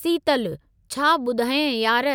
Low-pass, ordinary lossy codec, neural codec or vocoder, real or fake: none; none; none; real